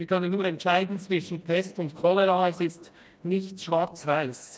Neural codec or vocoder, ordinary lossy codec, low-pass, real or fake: codec, 16 kHz, 1 kbps, FreqCodec, smaller model; none; none; fake